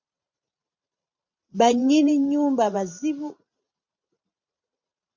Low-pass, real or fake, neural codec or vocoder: 7.2 kHz; fake; vocoder, 44.1 kHz, 128 mel bands every 512 samples, BigVGAN v2